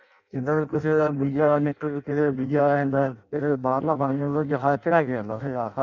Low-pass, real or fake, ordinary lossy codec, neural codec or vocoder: 7.2 kHz; fake; none; codec, 16 kHz in and 24 kHz out, 0.6 kbps, FireRedTTS-2 codec